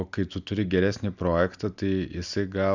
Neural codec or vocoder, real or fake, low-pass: none; real; 7.2 kHz